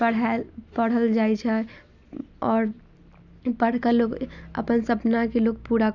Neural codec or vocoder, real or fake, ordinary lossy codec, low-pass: none; real; none; 7.2 kHz